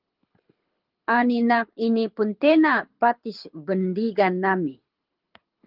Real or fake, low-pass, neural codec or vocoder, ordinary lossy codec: fake; 5.4 kHz; codec, 24 kHz, 6 kbps, HILCodec; Opus, 32 kbps